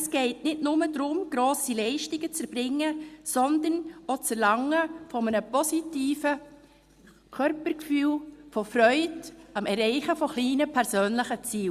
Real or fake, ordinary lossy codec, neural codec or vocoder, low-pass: real; Opus, 64 kbps; none; 14.4 kHz